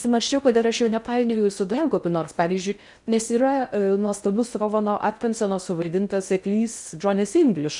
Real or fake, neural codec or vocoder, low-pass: fake; codec, 16 kHz in and 24 kHz out, 0.6 kbps, FocalCodec, streaming, 4096 codes; 10.8 kHz